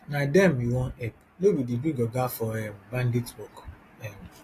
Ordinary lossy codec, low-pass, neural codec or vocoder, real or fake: AAC, 48 kbps; 14.4 kHz; none; real